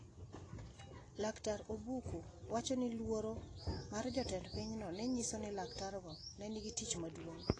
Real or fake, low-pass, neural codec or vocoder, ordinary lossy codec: real; 9.9 kHz; none; AAC, 32 kbps